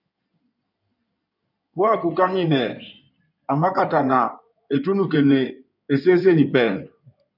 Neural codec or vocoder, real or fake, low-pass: codec, 16 kHz in and 24 kHz out, 2.2 kbps, FireRedTTS-2 codec; fake; 5.4 kHz